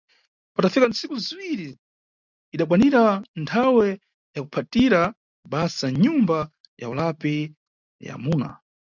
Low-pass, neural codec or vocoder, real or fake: 7.2 kHz; none; real